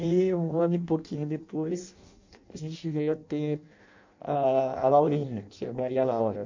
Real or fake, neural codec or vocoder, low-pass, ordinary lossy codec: fake; codec, 16 kHz in and 24 kHz out, 0.6 kbps, FireRedTTS-2 codec; 7.2 kHz; MP3, 64 kbps